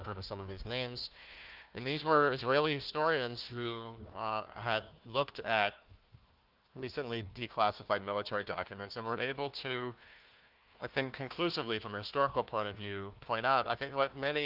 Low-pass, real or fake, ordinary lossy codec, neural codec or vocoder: 5.4 kHz; fake; Opus, 32 kbps; codec, 16 kHz, 1 kbps, FunCodec, trained on Chinese and English, 50 frames a second